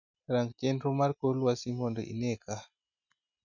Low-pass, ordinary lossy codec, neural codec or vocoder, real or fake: 7.2 kHz; none; none; real